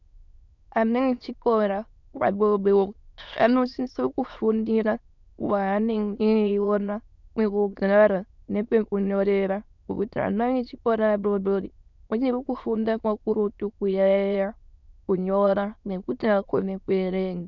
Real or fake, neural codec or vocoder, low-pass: fake; autoencoder, 22.05 kHz, a latent of 192 numbers a frame, VITS, trained on many speakers; 7.2 kHz